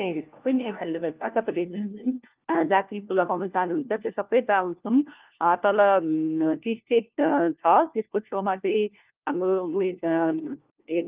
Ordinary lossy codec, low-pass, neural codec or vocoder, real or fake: Opus, 32 kbps; 3.6 kHz; codec, 16 kHz, 1 kbps, FunCodec, trained on LibriTTS, 50 frames a second; fake